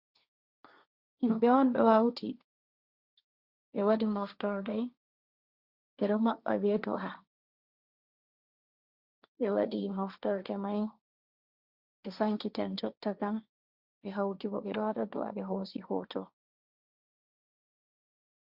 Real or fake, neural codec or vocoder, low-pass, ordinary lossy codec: fake; codec, 16 kHz, 1.1 kbps, Voila-Tokenizer; 5.4 kHz; Opus, 64 kbps